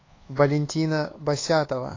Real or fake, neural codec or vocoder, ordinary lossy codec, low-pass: fake; codec, 24 kHz, 1.2 kbps, DualCodec; AAC, 32 kbps; 7.2 kHz